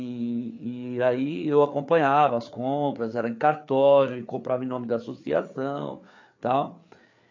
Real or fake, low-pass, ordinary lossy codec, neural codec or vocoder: fake; 7.2 kHz; AAC, 48 kbps; codec, 16 kHz, 4 kbps, FreqCodec, larger model